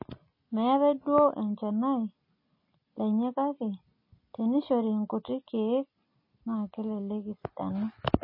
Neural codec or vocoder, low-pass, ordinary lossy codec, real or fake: none; 5.4 kHz; MP3, 24 kbps; real